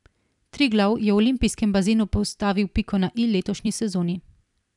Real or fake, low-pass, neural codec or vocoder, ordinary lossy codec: fake; 10.8 kHz; vocoder, 44.1 kHz, 128 mel bands every 256 samples, BigVGAN v2; none